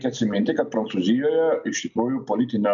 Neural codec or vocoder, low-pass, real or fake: none; 7.2 kHz; real